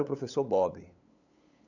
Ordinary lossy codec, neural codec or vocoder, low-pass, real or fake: none; codec, 16 kHz, 8 kbps, FunCodec, trained on LibriTTS, 25 frames a second; 7.2 kHz; fake